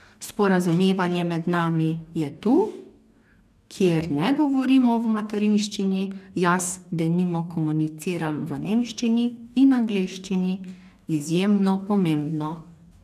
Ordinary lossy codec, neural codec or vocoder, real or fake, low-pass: none; codec, 44.1 kHz, 2.6 kbps, DAC; fake; 14.4 kHz